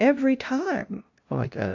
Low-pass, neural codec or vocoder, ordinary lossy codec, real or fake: 7.2 kHz; codec, 16 kHz, 0.5 kbps, FunCodec, trained on LibriTTS, 25 frames a second; AAC, 48 kbps; fake